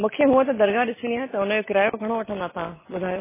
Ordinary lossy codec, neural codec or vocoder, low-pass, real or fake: MP3, 16 kbps; none; 3.6 kHz; real